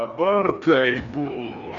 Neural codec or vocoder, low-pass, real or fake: codec, 16 kHz, 2 kbps, FreqCodec, larger model; 7.2 kHz; fake